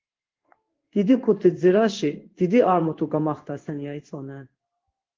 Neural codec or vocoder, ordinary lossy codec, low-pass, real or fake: codec, 16 kHz in and 24 kHz out, 1 kbps, XY-Tokenizer; Opus, 16 kbps; 7.2 kHz; fake